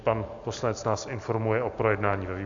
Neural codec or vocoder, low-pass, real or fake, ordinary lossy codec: none; 7.2 kHz; real; AAC, 64 kbps